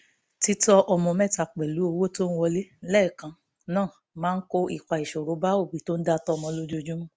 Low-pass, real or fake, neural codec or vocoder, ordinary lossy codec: none; real; none; none